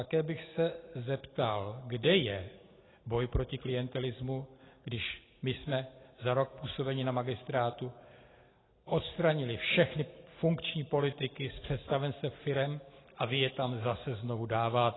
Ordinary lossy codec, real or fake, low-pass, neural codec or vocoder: AAC, 16 kbps; real; 7.2 kHz; none